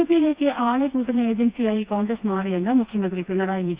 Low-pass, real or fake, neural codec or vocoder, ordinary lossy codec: 3.6 kHz; fake; codec, 16 kHz, 2 kbps, FreqCodec, smaller model; Opus, 64 kbps